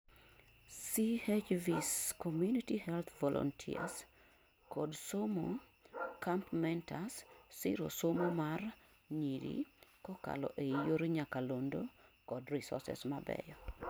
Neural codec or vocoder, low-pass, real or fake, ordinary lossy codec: none; none; real; none